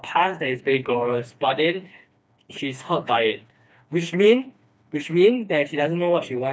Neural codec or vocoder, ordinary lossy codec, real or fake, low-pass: codec, 16 kHz, 2 kbps, FreqCodec, smaller model; none; fake; none